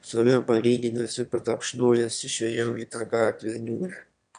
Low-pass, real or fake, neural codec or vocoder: 9.9 kHz; fake; autoencoder, 22.05 kHz, a latent of 192 numbers a frame, VITS, trained on one speaker